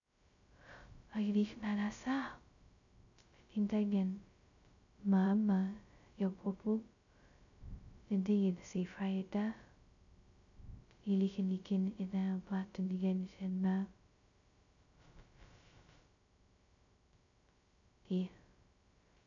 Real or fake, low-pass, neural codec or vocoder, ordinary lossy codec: fake; 7.2 kHz; codec, 16 kHz, 0.2 kbps, FocalCodec; none